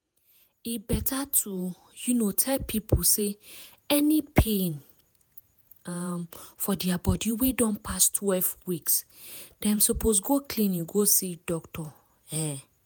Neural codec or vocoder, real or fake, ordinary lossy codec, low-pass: vocoder, 48 kHz, 128 mel bands, Vocos; fake; none; none